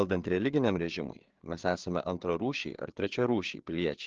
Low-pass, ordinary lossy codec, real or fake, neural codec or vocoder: 7.2 kHz; Opus, 16 kbps; fake; codec, 16 kHz, 4 kbps, FreqCodec, larger model